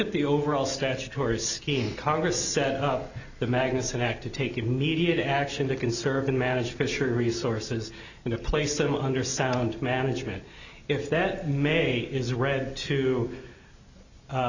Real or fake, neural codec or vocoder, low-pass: real; none; 7.2 kHz